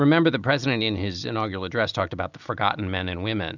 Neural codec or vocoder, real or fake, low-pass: none; real; 7.2 kHz